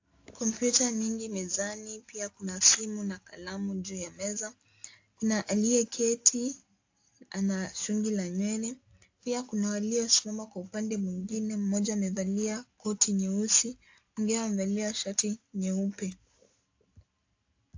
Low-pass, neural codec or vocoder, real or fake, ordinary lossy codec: 7.2 kHz; none; real; AAC, 48 kbps